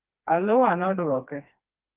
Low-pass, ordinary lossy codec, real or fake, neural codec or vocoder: 3.6 kHz; Opus, 24 kbps; fake; codec, 16 kHz, 2 kbps, FreqCodec, smaller model